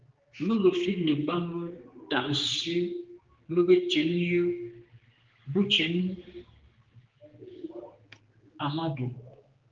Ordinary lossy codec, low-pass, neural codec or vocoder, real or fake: Opus, 16 kbps; 7.2 kHz; codec, 16 kHz, 4 kbps, X-Codec, HuBERT features, trained on general audio; fake